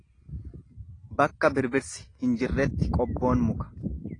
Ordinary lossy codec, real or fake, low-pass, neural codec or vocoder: AAC, 32 kbps; real; 9.9 kHz; none